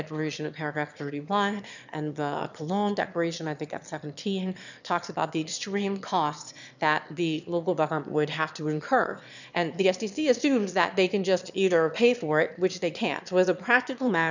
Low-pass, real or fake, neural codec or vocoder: 7.2 kHz; fake; autoencoder, 22.05 kHz, a latent of 192 numbers a frame, VITS, trained on one speaker